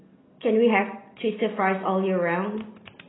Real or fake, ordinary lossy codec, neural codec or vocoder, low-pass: real; AAC, 16 kbps; none; 7.2 kHz